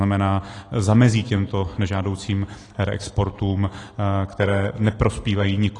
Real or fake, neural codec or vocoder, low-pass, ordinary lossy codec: real; none; 10.8 kHz; AAC, 32 kbps